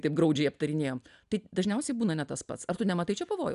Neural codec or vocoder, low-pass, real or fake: none; 10.8 kHz; real